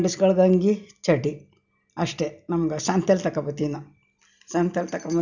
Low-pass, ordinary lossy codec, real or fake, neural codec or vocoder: 7.2 kHz; none; real; none